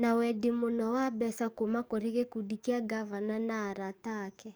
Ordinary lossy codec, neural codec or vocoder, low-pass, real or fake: none; codec, 44.1 kHz, 7.8 kbps, DAC; none; fake